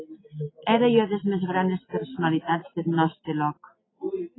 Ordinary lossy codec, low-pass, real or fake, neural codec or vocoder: AAC, 16 kbps; 7.2 kHz; real; none